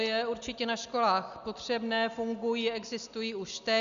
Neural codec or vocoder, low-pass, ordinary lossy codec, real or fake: none; 7.2 kHz; Opus, 64 kbps; real